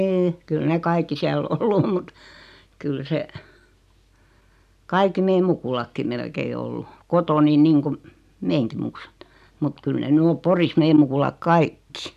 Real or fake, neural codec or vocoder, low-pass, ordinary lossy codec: real; none; 14.4 kHz; none